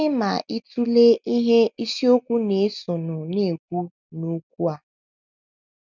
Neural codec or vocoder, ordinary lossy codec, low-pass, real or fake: none; none; 7.2 kHz; real